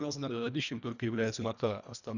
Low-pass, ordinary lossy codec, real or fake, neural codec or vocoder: 7.2 kHz; none; fake; codec, 24 kHz, 1.5 kbps, HILCodec